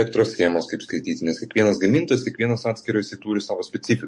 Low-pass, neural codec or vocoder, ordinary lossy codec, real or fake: 10.8 kHz; codec, 44.1 kHz, 7.8 kbps, DAC; MP3, 48 kbps; fake